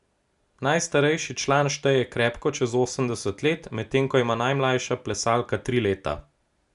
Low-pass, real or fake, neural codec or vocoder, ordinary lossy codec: 10.8 kHz; real; none; MP3, 96 kbps